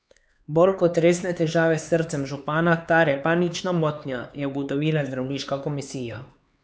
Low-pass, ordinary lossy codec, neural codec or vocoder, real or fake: none; none; codec, 16 kHz, 4 kbps, X-Codec, HuBERT features, trained on LibriSpeech; fake